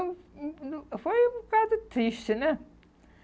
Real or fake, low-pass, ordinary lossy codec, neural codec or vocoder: real; none; none; none